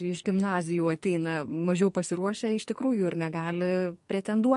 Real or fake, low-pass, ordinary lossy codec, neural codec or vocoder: fake; 14.4 kHz; MP3, 48 kbps; codec, 44.1 kHz, 2.6 kbps, SNAC